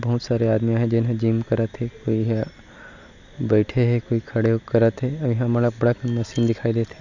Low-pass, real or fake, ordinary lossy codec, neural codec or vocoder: 7.2 kHz; real; none; none